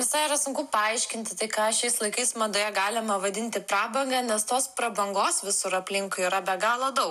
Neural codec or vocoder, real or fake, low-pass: none; real; 14.4 kHz